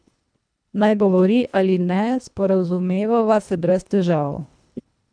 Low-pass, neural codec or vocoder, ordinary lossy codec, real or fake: 9.9 kHz; codec, 24 kHz, 1.5 kbps, HILCodec; none; fake